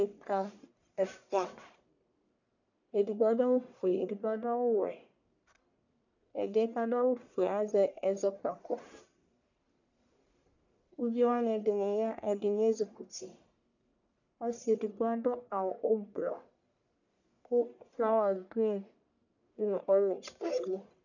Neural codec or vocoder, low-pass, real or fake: codec, 44.1 kHz, 1.7 kbps, Pupu-Codec; 7.2 kHz; fake